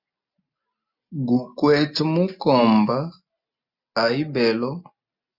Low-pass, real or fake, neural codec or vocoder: 5.4 kHz; real; none